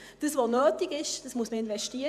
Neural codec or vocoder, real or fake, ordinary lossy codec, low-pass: vocoder, 48 kHz, 128 mel bands, Vocos; fake; none; 14.4 kHz